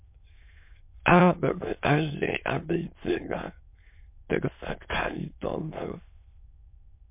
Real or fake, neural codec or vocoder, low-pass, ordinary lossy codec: fake; autoencoder, 22.05 kHz, a latent of 192 numbers a frame, VITS, trained on many speakers; 3.6 kHz; MP3, 32 kbps